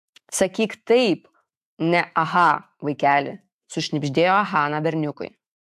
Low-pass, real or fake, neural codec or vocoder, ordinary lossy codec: 14.4 kHz; fake; autoencoder, 48 kHz, 128 numbers a frame, DAC-VAE, trained on Japanese speech; AAC, 96 kbps